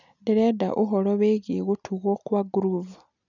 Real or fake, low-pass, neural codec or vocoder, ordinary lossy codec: real; 7.2 kHz; none; none